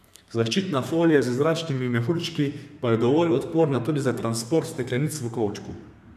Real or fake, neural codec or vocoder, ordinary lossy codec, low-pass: fake; codec, 32 kHz, 1.9 kbps, SNAC; none; 14.4 kHz